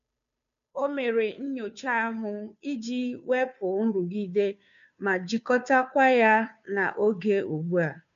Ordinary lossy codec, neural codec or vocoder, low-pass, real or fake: none; codec, 16 kHz, 2 kbps, FunCodec, trained on Chinese and English, 25 frames a second; 7.2 kHz; fake